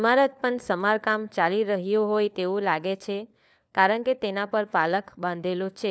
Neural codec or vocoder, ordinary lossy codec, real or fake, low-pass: codec, 16 kHz, 4 kbps, FunCodec, trained on LibriTTS, 50 frames a second; none; fake; none